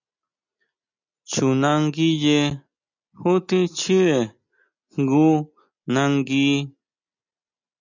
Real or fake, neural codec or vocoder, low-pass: real; none; 7.2 kHz